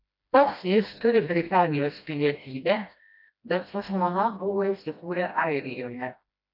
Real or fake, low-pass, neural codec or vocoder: fake; 5.4 kHz; codec, 16 kHz, 1 kbps, FreqCodec, smaller model